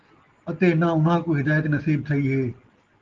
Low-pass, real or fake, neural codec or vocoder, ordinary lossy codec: 7.2 kHz; real; none; Opus, 16 kbps